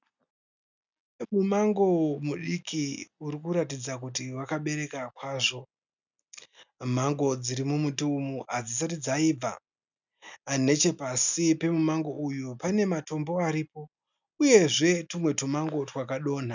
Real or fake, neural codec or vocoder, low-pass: real; none; 7.2 kHz